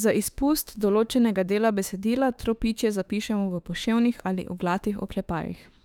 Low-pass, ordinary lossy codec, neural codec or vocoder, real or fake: 19.8 kHz; none; autoencoder, 48 kHz, 32 numbers a frame, DAC-VAE, trained on Japanese speech; fake